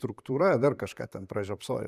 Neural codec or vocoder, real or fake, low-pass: vocoder, 44.1 kHz, 128 mel bands, Pupu-Vocoder; fake; 14.4 kHz